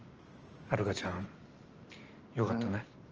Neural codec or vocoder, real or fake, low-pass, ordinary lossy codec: vocoder, 44.1 kHz, 128 mel bands every 512 samples, BigVGAN v2; fake; 7.2 kHz; Opus, 24 kbps